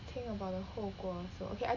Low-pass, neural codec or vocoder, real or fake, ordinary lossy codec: 7.2 kHz; none; real; none